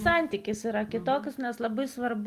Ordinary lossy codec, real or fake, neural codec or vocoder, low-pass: Opus, 32 kbps; real; none; 14.4 kHz